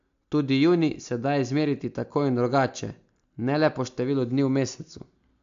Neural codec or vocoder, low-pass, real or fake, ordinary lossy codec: none; 7.2 kHz; real; AAC, 64 kbps